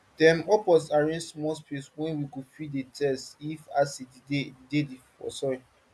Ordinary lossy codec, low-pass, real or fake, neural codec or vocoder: none; none; real; none